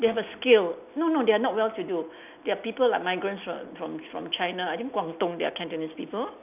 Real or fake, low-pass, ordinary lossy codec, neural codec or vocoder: real; 3.6 kHz; none; none